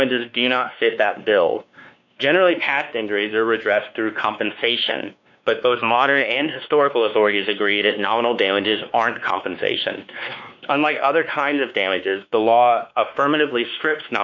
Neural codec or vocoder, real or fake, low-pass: codec, 16 kHz, 2 kbps, X-Codec, WavLM features, trained on Multilingual LibriSpeech; fake; 7.2 kHz